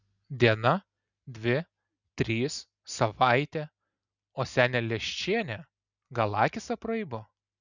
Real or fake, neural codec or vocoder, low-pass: real; none; 7.2 kHz